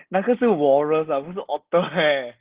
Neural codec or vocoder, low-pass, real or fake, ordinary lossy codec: none; 3.6 kHz; real; Opus, 16 kbps